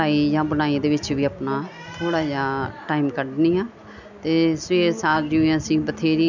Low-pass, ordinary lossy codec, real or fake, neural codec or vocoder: 7.2 kHz; none; real; none